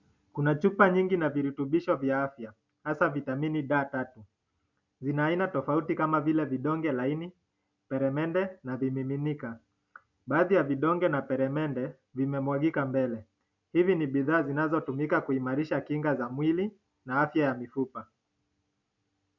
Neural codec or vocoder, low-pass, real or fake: none; 7.2 kHz; real